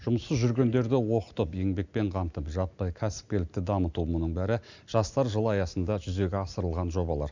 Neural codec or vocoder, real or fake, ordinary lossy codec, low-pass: none; real; none; 7.2 kHz